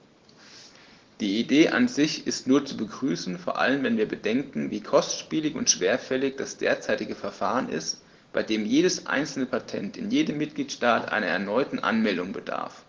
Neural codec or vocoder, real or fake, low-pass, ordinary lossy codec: none; real; 7.2 kHz; Opus, 16 kbps